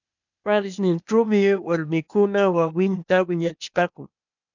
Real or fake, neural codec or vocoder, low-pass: fake; codec, 16 kHz, 0.8 kbps, ZipCodec; 7.2 kHz